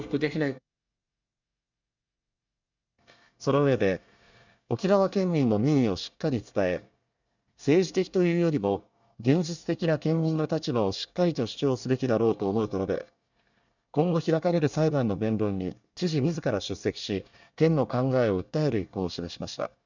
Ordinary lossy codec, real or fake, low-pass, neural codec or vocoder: none; fake; 7.2 kHz; codec, 24 kHz, 1 kbps, SNAC